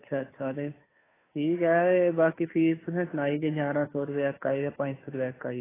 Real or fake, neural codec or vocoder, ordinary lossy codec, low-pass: fake; codec, 16 kHz, 2 kbps, FunCodec, trained on Chinese and English, 25 frames a second; AAC, 16 kbps; 3.6 kHz